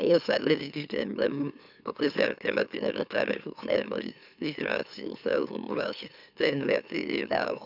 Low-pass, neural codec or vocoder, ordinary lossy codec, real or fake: 5.4 kHz; autoencoder, 44.1 kHz, a latent of 192 numbers a frame, MeloTTS; none; fake